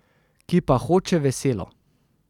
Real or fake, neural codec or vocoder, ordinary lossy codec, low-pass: real; none; none; 19.8 kHz